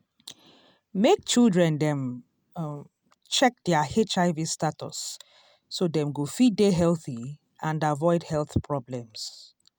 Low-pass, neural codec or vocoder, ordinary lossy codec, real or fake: none; none; none; real